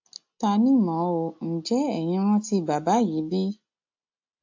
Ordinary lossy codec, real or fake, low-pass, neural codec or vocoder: AAC, 48 kbps; real; 7.2 kHz; none